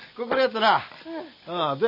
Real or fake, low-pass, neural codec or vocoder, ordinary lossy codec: real; 5.4 kHz; none; none